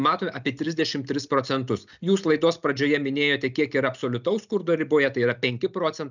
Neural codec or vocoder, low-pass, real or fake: none; 7.2 kHz; real